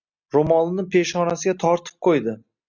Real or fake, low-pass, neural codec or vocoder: real; 7.2 kHz; none